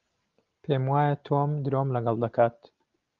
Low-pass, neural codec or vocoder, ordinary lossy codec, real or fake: 7.2 kHz; none; Opus, 32 kbps; real